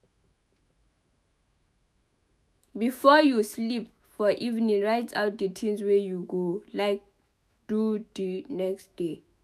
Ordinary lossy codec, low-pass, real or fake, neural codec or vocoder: none; 14.4 kHz; fake; autoencoder, 48 kHz, 128 numbers a frame, DAC-VAE, trained on Japanese speech